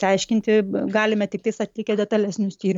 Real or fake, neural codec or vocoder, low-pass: real; none; 19.8 kHz